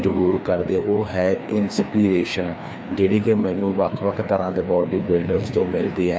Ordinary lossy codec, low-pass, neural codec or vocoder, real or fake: none; none; codec, 16 kHz, 2 kbps, FreqCodec, larger model; fake